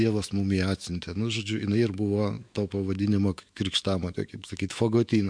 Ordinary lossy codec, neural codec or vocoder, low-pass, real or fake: MP3, 64 kbps; autoencoder, 48 kHz, 128 numbers a frame, DAC-VAE, trained on Japanese speech; 9.9 kHz; fake